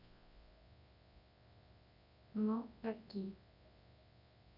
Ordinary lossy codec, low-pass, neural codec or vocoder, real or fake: none; 5.4 kHz; codec, 24 kHz, 0.9 kbps, WavTokenizer, large speech release; fake